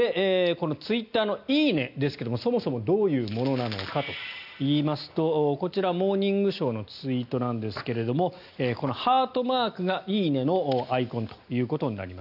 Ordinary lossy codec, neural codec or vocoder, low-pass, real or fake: none; none; 5.4 kHz; real